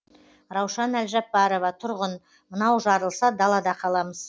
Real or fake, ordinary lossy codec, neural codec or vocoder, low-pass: real; none; none; none